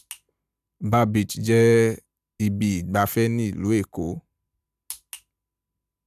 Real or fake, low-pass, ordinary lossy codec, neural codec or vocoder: real; 14.4 kHz; none; none